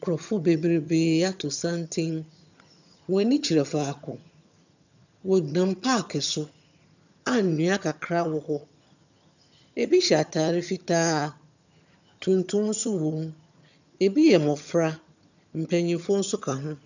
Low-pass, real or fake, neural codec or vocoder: 7.2 kHz; fake; vocoder, 22.05 kHz, 80 mel bands, HiFi-GAN